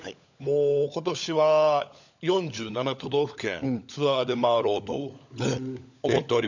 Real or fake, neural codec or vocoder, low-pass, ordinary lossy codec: fake; codec, 16 kHz, 16 kbps, FunCodec, trained on LibriTTS, 50 frames a second; 7.2 kHz; none